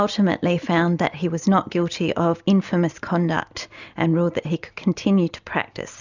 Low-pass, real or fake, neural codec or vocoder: 7.2 kHz; real; none